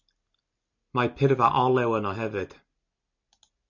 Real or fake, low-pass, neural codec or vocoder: real; 7.2 kHz; none